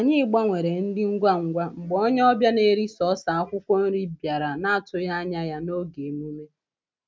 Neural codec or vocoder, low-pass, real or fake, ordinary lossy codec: none; none; real; none